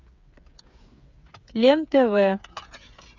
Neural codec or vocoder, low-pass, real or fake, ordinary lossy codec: codec, 16 kHz, 8 kbps, FreqCodec, smaller model; 7.2 kHz; fake; Opus, 64 kbps